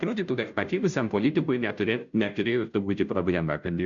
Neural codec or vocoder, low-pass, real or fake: codec, 16 kHz, 0.5 kbps, FunCodec, trained on Chinese and English, 25 frames a second; 7.2 kHz; fake